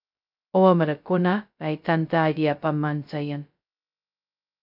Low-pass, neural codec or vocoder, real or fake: 5.4 kHz; codec, 16 kHz, 0.2 kbps, FocalCodec; fake